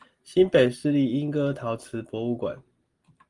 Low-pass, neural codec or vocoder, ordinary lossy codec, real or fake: 10.8 kHz; none; Opus, 24 kbps; real